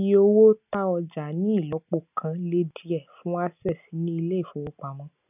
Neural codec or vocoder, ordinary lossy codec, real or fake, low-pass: none; none; real; 3.6 kHz